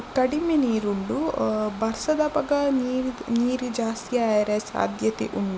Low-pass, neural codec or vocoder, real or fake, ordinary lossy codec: none; none; real; none